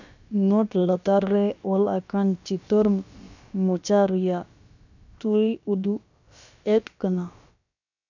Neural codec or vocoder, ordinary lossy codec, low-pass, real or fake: codec, 16 kHz, about 1 kbps, DyCAST, with the encoder's durations; none; 7.2 kHz; fake